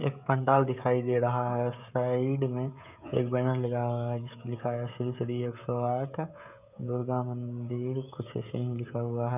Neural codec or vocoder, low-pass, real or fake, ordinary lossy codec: codec, 16 kHz, 16 kbps, FreqCodec, smaller model; 3.6 kHz; fake; none